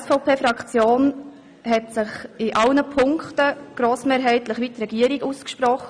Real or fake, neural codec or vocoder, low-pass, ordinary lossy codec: real; none; 9.9 kHz; none